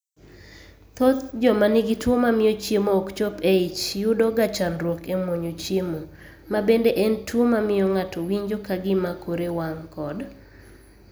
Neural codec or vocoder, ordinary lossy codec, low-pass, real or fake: none; none; none; real